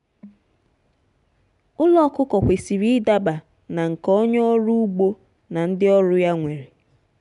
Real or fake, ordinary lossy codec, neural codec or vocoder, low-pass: real; none; none; 10.8 kHz